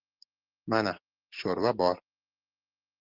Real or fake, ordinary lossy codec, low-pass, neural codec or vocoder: real; Opus, 16 kbps; 5.4 kHz; none